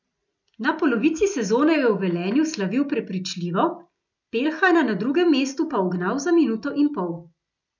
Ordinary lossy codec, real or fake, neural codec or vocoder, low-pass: none; real; none; 7.2 kHz